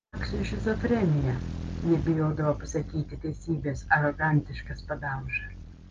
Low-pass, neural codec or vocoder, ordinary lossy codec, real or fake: 7.2 kHz; none; Opus, 24 kbps; real